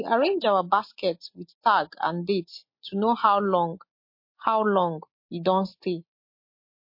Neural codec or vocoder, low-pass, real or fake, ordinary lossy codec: none; 5.4 kHz; real; MP3, 32 kbps